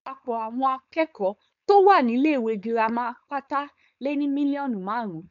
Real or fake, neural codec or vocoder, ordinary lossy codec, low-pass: fake; codec, 16 kHz, 4.8 kbps, FACodec; none; 7.2 kHz